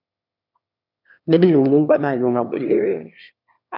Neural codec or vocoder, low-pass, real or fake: autoencoder, 22.05 kHz, a latent of 192 numbers a frame, VITS, trained on one speaker; 5.4 kHz; fake